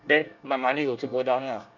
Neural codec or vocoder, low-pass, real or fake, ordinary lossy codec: codec, 24 kHz, 1 kbps, SNAC; 7.2 kHz; fake; none